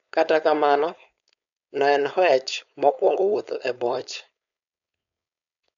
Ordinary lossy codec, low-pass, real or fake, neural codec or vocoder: none; 7.2 kHz; fake; codec, 16 kHz, 4.8 kbps, FACodec